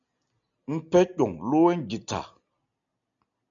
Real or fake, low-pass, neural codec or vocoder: real; 7.2 kHz; none